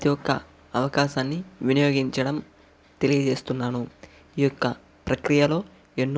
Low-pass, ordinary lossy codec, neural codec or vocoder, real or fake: none; none; none; real